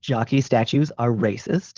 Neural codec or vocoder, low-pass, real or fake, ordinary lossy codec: codec, 16 kHz, 4.8 kbps, FACodec; 7.2 kHz; fake; Opus, 16 kbps